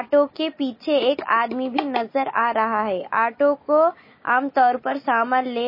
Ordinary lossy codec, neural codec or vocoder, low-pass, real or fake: MP3, 24 kbps; none; 5.4 kHz; real